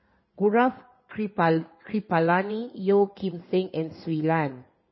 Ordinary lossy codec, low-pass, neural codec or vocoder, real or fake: MP3, 24 kbps; 7.2 kHz; codec, 16 kHz in and 24 kHz out, 2.2 kbps, FireRedTTS-2 codec; fake